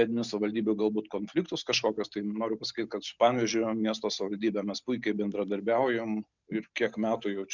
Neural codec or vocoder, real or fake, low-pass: none; real; 7.2 kHz